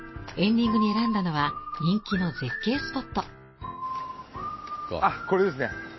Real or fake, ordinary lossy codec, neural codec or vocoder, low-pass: real; MP3, 24 kbps; none; 7.2 kHz